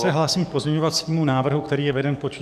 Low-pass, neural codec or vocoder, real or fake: 14.4 kHz; codec, 44.1 kHz, 7.8 kbps, DAC; fake